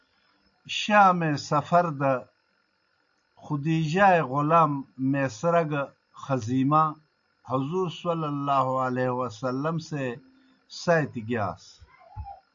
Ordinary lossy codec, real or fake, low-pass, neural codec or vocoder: AAC, 64 kbps; real; 7.2 kHz; none